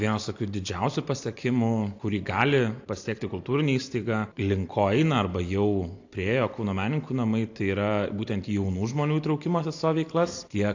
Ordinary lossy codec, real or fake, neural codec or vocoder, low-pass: AAC, 48 kbps; real; none; 7.2 kHz